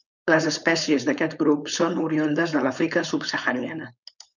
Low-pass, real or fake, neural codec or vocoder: 7.2 kHz; fake; codec, 16 kHz, 4.8 kbps, FACodec